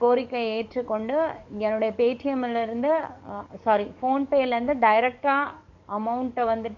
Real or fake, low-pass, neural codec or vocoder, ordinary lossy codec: fake; 7.2 kHz; codec, 44.1 kHz, 7.8 kbps, DAC; none